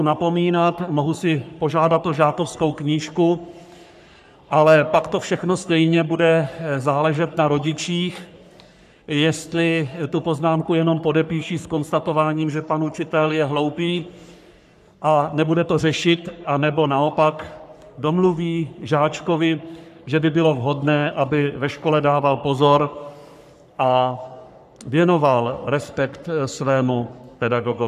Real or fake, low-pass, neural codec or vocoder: fake; 14.4 kHz; codec, 44.1 kHz, 3.4 kbps, Pupu-Codec